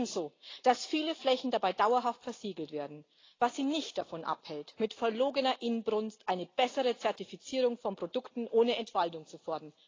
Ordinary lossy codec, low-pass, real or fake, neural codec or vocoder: AAC, 32 kbps; 7.2 kHz; real; none